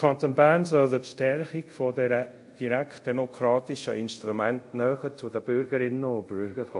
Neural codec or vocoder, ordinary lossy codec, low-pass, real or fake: codec, 24 kHz, 0.5 kbps, DualCodec; MP3, 48 kbps; 10.8 kHz; fake